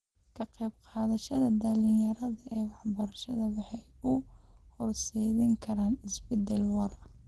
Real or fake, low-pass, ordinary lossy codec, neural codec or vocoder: real; 10.8 kHz; Opus, 16 kbps; none